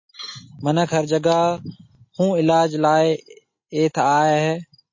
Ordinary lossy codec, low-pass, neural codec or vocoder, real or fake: MP3, 32 kbps; 7.2 kHz; none; real